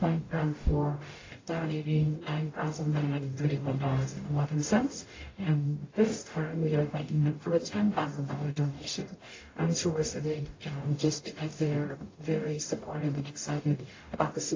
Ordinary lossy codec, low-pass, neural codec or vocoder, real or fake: AAC, 32 kbps; 7.2 kHz; codec, 44.1 kHz, 0.9 kbps, DAC; fake